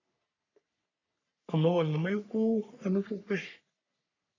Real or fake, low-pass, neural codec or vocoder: fake; 7.2 kHz; codec, 44.1 kHz, 3.4 kbps, Pupu-Codec